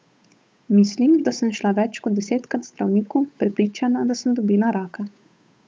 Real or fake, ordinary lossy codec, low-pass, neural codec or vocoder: fake; none; none; codec, 16 kHz, 8 kbps, FunCodec, trained on Chinese and English, 25 frames a second